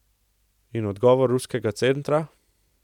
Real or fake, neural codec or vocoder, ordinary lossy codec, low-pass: real; none; none; 19.8 kHz